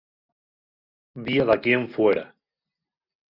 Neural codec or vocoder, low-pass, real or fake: none; 5.4 kHz; real